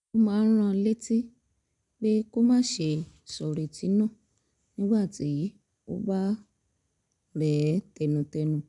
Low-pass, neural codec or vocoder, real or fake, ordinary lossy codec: 10.8 kHz; none; real; none